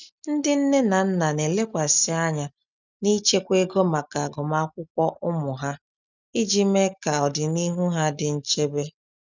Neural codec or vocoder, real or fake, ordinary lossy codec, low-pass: none; real; none; 7.2 kHz